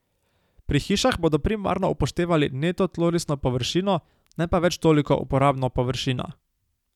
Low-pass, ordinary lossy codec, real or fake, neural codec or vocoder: 19.8 kHz; none; real; none